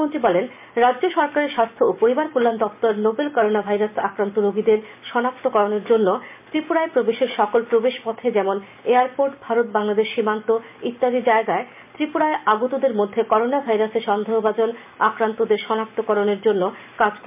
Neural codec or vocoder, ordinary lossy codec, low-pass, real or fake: none; MP3, 32 kbps; 3.6 kHz; real